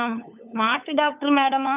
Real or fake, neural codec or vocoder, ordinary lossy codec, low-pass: fake; codec, 16 kHz, 8 kbps, FunCodec, trained on LibriTTS, 25 frames a second; none; 3.6 kHz